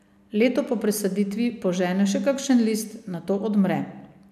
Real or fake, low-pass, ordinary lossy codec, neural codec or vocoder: real; 14.4 kHz; none; none